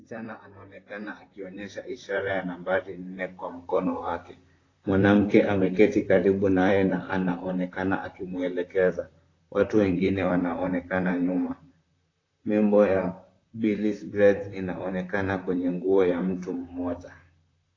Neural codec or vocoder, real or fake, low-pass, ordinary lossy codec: vocoder, 44.1 kHz, 128 mel bands, Pupu-Vocoder; fake; 7.2 kHz; AAC, 32 kbps